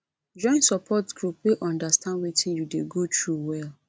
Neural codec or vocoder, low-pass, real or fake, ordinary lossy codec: none; none; real; none